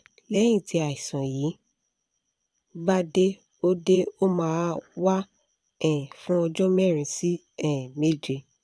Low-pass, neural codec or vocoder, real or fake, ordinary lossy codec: none; vocoder, 22.05 kHz, 80 mel bands, Vocos; fake; none